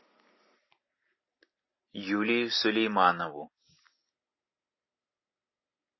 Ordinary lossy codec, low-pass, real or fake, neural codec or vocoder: MP3, 24 kbps; 7.2 kHz; real; none